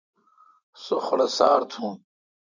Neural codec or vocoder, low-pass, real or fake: vocoder, 24 kHz, 100 mel bands, Vocos; 7.2 kHz; fake